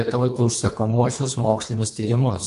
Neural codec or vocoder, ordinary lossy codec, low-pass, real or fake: codec, 24 kHz, 1.5 kbps, HILCodec; AAC, 64 kbps; 10.8 kHz; fake